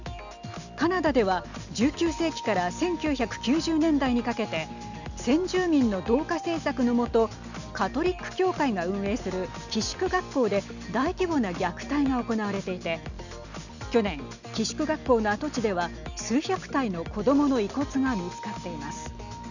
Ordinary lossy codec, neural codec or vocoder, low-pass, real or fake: none; none; 7.2 kHz; real